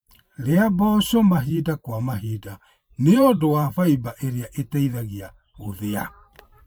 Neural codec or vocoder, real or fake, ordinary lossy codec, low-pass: vocoder, 44.1 kHz, 128 mel bands every 512 samples, BigVGAN v2; fake; none; none